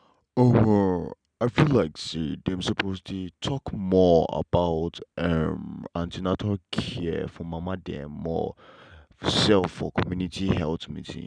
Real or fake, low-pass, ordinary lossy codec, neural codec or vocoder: real; 9.9 kHz; none; none